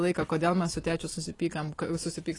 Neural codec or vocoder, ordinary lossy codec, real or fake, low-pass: none; AAC, 32 kbps; real; 10.8 kHz